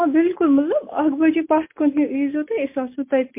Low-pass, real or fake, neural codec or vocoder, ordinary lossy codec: 3.6 kHz; real; none; MP3, 24 kbps